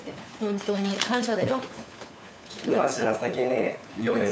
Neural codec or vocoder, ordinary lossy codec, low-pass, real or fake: codec, 16 kHz, 4 kbps, FunCodec, trained on LibriTTS, 50 frames a second; none; none; fake